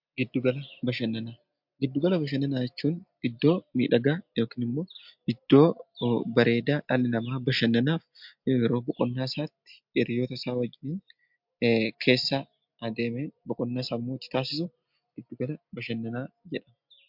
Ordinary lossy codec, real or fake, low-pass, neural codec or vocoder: MP3, 48 kbps; real; 5.4 kHz; none